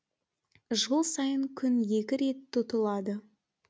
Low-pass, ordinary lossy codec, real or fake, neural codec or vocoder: none; none; real; none